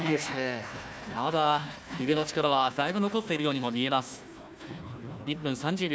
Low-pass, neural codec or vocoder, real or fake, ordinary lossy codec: none; codec, 16 kHz, 1 kbps, FunCodec, trained on Chinese and English, 50 frames a second; fake; none